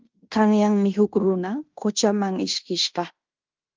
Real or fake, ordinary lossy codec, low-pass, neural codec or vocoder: fake; Opus, 24 kbps; 7.2 kHz; codec, 16 kHz in and 24 kHz out, 0.9 kbps, LongCat-Audio-Codec, fine tuned four codebook decoder